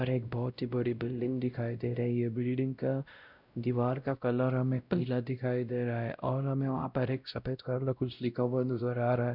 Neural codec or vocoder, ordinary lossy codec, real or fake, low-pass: codec, 16 kHz, 0.5 kbps, X-Codec, WavLM features, trained on Multilingual LibriSpeech; none; fake; 5.4 kHz